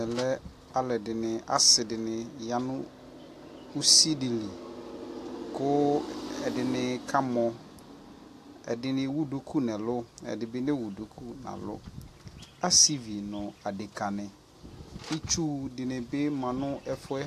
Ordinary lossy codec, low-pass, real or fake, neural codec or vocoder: AAC, 64 kbps; 14.4 kHz; real; none